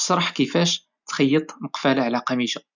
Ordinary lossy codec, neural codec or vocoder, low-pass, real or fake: none; none; 7.2 kHz; real